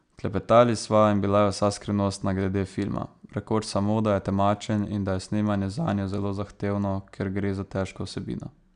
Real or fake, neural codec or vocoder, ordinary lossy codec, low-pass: real; none; Opus, 64 kbps; 9.9 kHz